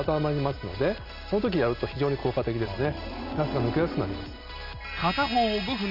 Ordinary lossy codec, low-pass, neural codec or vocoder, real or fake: none; 5.4 kHz; none; real